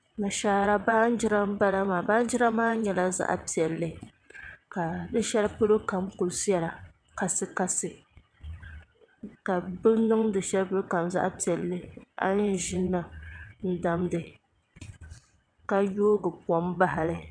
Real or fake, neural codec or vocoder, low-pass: fake; vocoder, 22.05 kHz, 80 mel bands, WaveNeXt; 9.9 kHz